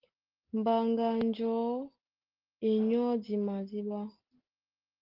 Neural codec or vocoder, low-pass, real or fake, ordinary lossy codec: none; 5.4 kHz; real; Opus, 16 kbps